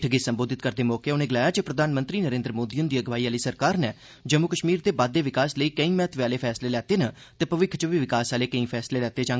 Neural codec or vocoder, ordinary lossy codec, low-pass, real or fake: none; none; none; real